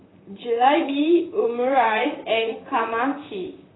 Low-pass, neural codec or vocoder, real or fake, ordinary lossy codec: 7.2 kHz; vocoder, 44.1 kHz, 128 mel bands, Pupu-Vocoder; fake; AAC, 16 kbps